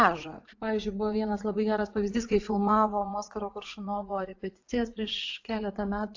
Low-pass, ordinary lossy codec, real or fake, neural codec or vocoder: 7.2 kHz; AAC, 48 kbps; fake; vocoder, 44.1 kHz, 80 mel bands, Vocos